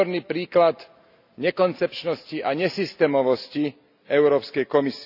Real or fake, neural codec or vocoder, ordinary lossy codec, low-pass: real; none; none; 5.4 kHz